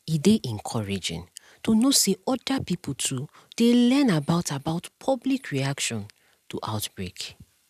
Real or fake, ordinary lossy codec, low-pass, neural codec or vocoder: real; none; 14.4 kHz; none